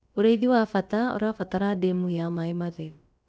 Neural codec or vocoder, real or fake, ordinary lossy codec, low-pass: codec, 16 kHz, about 1 kbps, DyCAST, with the encoder's durations; fake; none; none